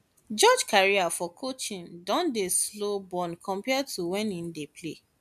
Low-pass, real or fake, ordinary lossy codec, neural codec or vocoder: 14.4 kHz; real; MP3, 96 kbps; none